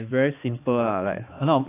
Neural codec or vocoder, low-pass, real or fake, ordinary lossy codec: codec, 16 kHz, 2 kbps, X-Codec, HuBERT features, trained on balanced general audio; 3.6 kHz; fake; AAC, 24 kbps